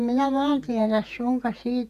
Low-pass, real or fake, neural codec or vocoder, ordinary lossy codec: 14.4 kHz; fake; vocoder, 48 kHz, 128 mel bands, Vocos; none